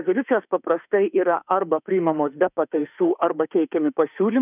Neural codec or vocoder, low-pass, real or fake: autoencoder, 48 kHz, 32 numbers a frame, DAC-VAE, trained on Japanese speech; 3.6 kHz; fake